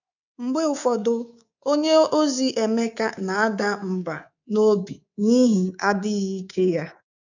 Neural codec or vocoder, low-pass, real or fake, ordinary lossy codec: codec, 24 kHz, 3.1 kbps, DualCodec; 7.2 kHz; fake; none